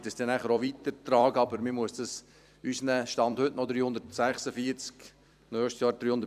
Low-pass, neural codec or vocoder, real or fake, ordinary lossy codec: 14.4 kHz; vocoder, 44.1 kHz, 128 mel bands every 256 samples, BigVGAN v2; fake; none